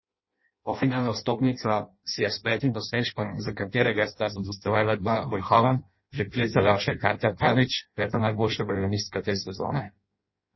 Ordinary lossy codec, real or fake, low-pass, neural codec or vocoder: MP3, 24 kbps; fake; 7.2 kHz; codec, 16 kHz in and 24 kHz out, 0.6 kbps, FireRedTTS-2 codec